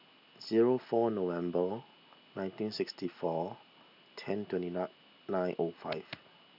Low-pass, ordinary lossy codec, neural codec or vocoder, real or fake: 5.4 kHz; none; none; real